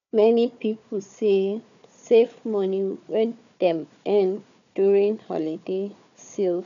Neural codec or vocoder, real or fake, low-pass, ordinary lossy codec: codec, 16 kHz, 4 kbps, FunCodec, trained on Chinese and English, 50 frames a second; fake; 7.2 kHz; none